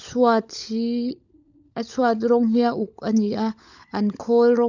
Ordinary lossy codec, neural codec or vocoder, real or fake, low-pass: none; codec, 16 kHz, 16 kbps, FunCodec, trained on LibriTTS, 50 frames a second; fake; 7.2 kHz